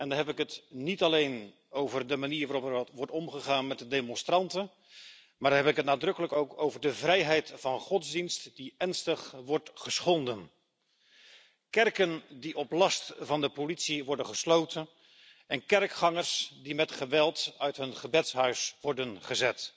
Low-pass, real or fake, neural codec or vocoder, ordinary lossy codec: none; real; none; none